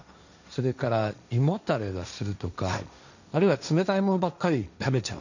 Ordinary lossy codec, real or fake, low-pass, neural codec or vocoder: none; fake; 7.2 kHz; codec, 16 kHz, 1.1 kbps, Voila-Tokenizer